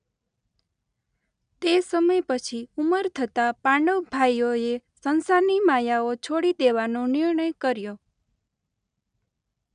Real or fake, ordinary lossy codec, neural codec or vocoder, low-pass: real; none; none; 9.9 kHz